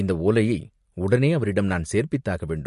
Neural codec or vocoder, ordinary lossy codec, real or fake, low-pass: none; MP3, 48 kbps; real; 14.4 kHz